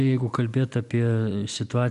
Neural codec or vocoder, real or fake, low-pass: none; real; 10.8 kHz